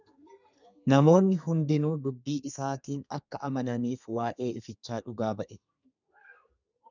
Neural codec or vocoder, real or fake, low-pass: codec, 32 kHz, 1.9 kbps, SNAC; fake; 7.2 kHz